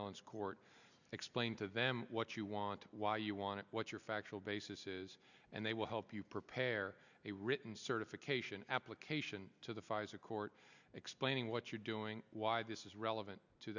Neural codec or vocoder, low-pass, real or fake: none; 7.2 kHz; real